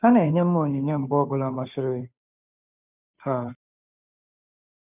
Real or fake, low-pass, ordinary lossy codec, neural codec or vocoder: fake; 3.6 kHz; none; codec, 16 kHz, 2 kbps, FunCodec, trained on Chinese and English, 25 frames a second